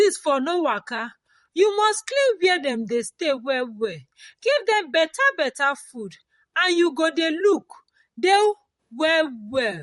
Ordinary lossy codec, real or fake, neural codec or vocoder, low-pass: MP3, 48 kbps; fake; vocoder, 44.1 kHz, 128 mel bands, Pupu-Vocoder; 19.8 kHz